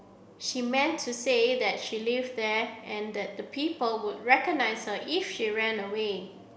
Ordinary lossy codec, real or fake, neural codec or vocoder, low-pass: none; real; none; none